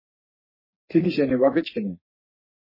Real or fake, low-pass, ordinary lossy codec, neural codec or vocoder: fake; 5.4 kHz; MP3, 24 kbps; codec, 44.1 kHz, 3.4 kbps, Pupu-Codec